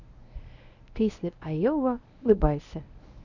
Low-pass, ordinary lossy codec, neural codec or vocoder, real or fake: 7.2 kHz; none; codec, 24 kHz, 0.9 kbps, WavTokenizer, medium speech release version 1; fake